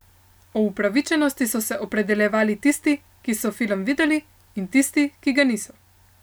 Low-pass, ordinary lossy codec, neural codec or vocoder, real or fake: none; none; none; real